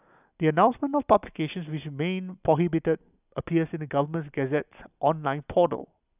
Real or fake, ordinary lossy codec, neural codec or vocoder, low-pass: real; none; none; 3.6 kHz